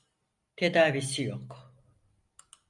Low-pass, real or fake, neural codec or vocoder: 10.8 kHz; real; none